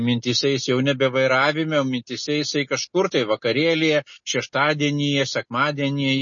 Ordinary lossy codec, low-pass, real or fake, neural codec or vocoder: MP3, 32 kbps; 7.2 kHz; real; none